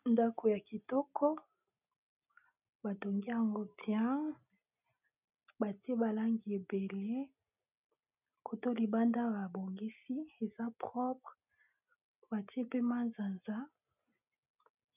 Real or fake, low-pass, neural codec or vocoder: real; 3.6 kHz; none